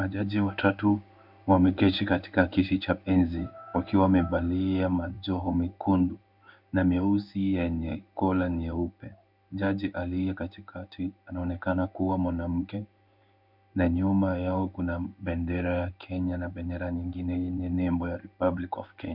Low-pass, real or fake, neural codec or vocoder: 5.4 kHz; fake; codec, 16 kHz in and 24 kHz out, 1 kbps, XY-Tokenizer